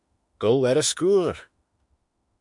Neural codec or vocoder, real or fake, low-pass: autoencoder, 48 kHz, 32 numbers a frame, DAC-VAE, trained on Japanese speech; fake; 10.8 kHz